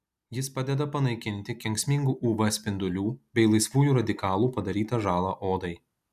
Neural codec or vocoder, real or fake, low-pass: none; real; 14.4 kHz